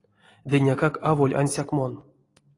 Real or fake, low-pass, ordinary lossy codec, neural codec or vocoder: real; 10.8 kHz; AAC, 32 kbps; none